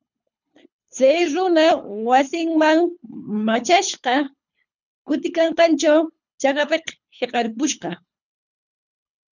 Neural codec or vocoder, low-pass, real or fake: codec, 24 kHz, 3 kbps, HILCodec; 7.2 kHz; fake